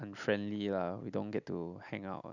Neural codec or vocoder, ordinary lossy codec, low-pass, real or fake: none; none; 7.2 kHz; real